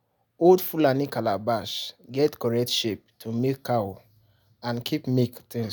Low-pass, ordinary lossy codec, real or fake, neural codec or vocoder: none; none; real; none